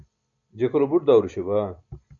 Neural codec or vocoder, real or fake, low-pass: none; real; 7.2 kHz